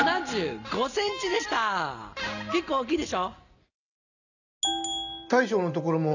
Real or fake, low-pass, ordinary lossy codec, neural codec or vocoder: real; 7.2 kHz; none; none